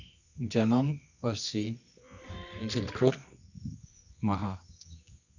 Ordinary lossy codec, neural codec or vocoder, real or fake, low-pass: none; codec, 24 kHz, 0.9 kbps, WavTokenizer, medium music audio release; fake; 7.2 kHz